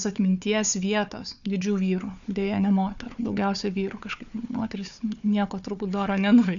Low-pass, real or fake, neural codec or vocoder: 7.2 kHz; fake; codec, 16 kHz, 4 kbps, FunCodec, trained on Chinese and English, 50 frames a second